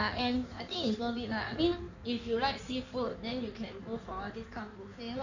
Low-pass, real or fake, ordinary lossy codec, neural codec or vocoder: 7.2 kHz; fake; none; codec, 16 kHz in and 24 kHz out, 1.1 kbps, FireRedTTS-2 codec